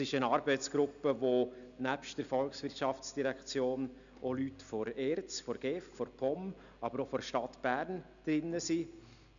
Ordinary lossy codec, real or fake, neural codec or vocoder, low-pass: none; real; none; 7.2 kHz